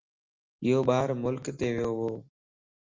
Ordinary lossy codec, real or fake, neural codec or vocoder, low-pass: Opus, 32 kbps; real; none; 7.2 kHz